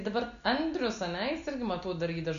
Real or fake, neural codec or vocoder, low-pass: real; none; 7.2 kHz